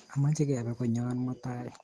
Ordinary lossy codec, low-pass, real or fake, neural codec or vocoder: Opus, 16 kbps; 10.8 kHz; real; none